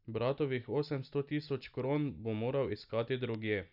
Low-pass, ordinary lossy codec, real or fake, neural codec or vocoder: 5.4 kHz; none; real; none